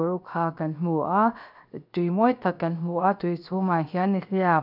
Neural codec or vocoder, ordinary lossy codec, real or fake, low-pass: codec, 16 kHz, 0.7 kbps, FocalCodec; none; fake; 5.4 kHz